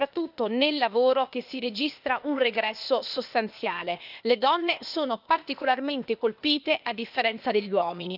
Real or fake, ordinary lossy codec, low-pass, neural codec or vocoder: fake; none; 5.4 kHz; codec, 16 kHz, 0.8 kbps, ZipCodec